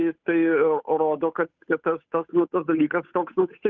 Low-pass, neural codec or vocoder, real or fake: 7.2 kHz; codec, 16 kHz, 8 kbps, FunCodec, trained on Chinese and English, 25 frames a second; fake